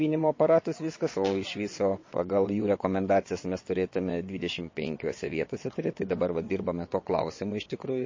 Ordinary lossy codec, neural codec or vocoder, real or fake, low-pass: MP3, 32 kbps; vocoder, 22.05 kHz, 80 mel bands, WaveNeXt; fake; 7.2 kHz